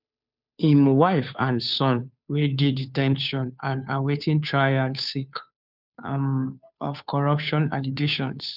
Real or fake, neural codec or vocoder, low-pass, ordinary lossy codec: fake; codec, 16 kHz, 2 kbps, FunCodec, trained on Chinese and English, 25 frames a second; 5.4 kHz; none